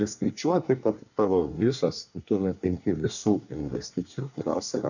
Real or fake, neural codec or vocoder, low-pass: fake; codec, 24 kHz, 1 kbps, SNAC; 7.2 kHz